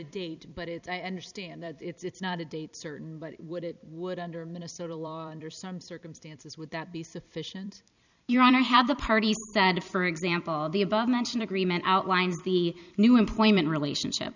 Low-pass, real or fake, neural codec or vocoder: 7.2 kHz; fake; vocoder, 44.1 kHz, 128 mel bands every 256 samples, BigVGAN v2